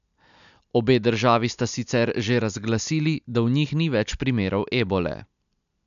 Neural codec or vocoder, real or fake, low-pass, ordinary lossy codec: none; real; 7.2 kHz; none